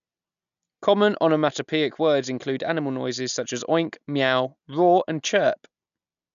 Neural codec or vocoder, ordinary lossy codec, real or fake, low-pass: none; none; real; 7.2 kHz